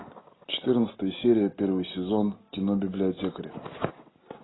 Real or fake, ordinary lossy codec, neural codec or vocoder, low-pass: real; AAC, 16 kbps; none; 7.2 kHz